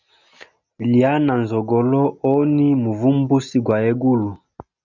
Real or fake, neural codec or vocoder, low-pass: real; none; 7.2 kHz